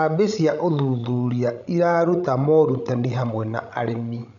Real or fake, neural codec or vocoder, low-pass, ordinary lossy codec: fake; codec, 16 kHz, 16 kbps, FreqCodec, larger model; 7.2 kHz; none